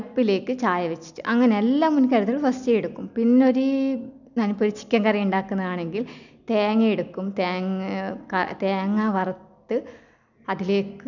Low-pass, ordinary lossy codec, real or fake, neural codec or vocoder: 7.2 kHz; none; real; none